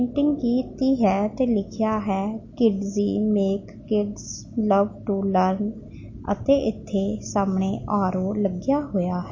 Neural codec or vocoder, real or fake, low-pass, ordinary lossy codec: none; real; 7.2 kHz; MP3, 32 kbps